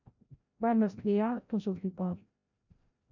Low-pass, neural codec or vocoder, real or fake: 7.2 kHz; codec, 16 kHz, 0.5 kbps, FreqCodec, larger model; fake